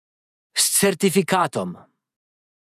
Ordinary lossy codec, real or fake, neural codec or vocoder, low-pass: none; fake; vocoder, 48 kHz, 128 mel bands, Vocos; 14.4 kHz